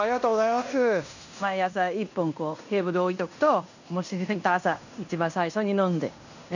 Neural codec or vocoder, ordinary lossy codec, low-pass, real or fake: codec, 16 kHz in and 24 kHz out, 0.9 kbps, LongCat-Audio-Codec, fine tuned four codebook decoder; none; 7.2 kHz; fake